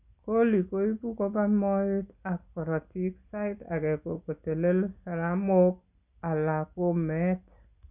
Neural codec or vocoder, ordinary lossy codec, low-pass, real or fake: none; none; 3.6 kHz; real